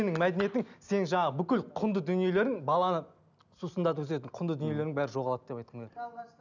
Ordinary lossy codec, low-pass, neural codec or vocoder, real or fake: none; 7.2 kHz; none; real